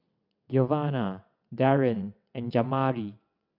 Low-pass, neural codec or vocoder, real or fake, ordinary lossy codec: 5.4 kHz; vocoder, 22.05 kHz, 80 mel bands, WaveNeXt; fake; none